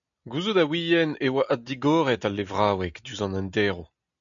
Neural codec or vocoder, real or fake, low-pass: none; real; 7.2 kHz